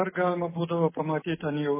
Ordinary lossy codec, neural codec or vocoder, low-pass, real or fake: MP3, 16 kbps; none; 3.6 kHz; real